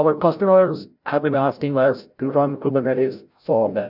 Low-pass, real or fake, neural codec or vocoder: 5.4 kHz; fake; codec, 16 kHz, 0.5 kbps, FreqCodec, larger model